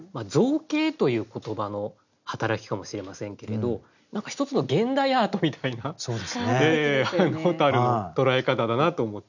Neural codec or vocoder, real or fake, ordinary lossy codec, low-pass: none; real; none; 7.2 kHz